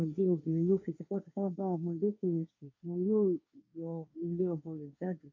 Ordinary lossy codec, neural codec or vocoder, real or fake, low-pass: none; codec, 24 kHz, 1 kbps, SNAC; fake; 7.2 kHz